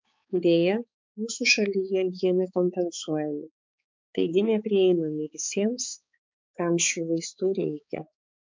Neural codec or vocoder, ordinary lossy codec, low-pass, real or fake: codec, 16 kHz, 4 kbps, X-Codec, HuBERT features, trained on balanced general audio; MP3, 64 kbps; 7.2 kHz; fake